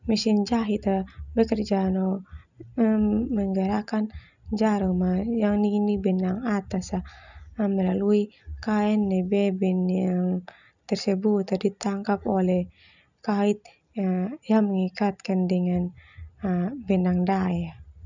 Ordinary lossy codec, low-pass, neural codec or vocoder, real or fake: none; 7.2 kHz; none; real